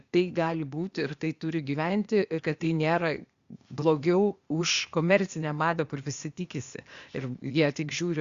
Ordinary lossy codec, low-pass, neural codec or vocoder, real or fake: Opus, 64 kbps; 7.2 kHz; codec, 16 kHz, 0.8 kbps, ZipCodec; fake